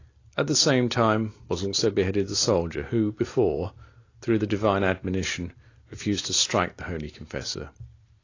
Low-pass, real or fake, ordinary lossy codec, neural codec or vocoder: 7.2 kHz; real; AAC, 32 kbps; none